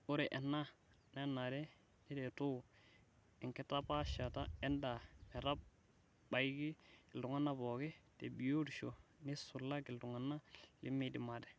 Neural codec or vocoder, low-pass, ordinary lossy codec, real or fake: none; none; none; real